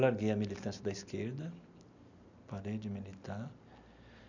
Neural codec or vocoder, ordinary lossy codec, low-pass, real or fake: none; none; 7.2 kHz; real